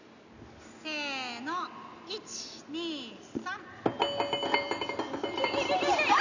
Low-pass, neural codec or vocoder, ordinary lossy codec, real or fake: 7.2 kHz; none; none; real